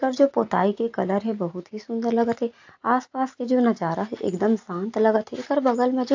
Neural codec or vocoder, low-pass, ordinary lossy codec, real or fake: none; 7.2 kHz; AAC, 48 kbps; real